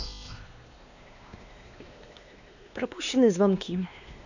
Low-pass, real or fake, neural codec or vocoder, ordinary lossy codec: 7.2 kHz; fake; codec, 16 kHz, 2 kbps, X-Codec, WavLM features, trained on Multilingual LibriSpeech; none